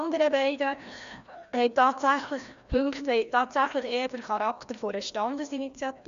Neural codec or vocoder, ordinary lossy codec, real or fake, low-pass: codec, 16 kHz, 1 kbps, FreqCodec, larger model; Opus, 64 kbps; fake; 7.2 kHz